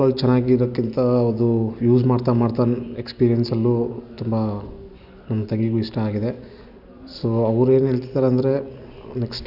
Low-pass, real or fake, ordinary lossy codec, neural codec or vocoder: 5.4 kHz; real; none; none